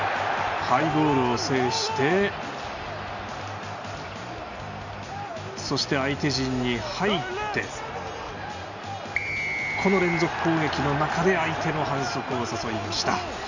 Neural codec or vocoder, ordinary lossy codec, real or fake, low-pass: none; none; real; 7.2 kHz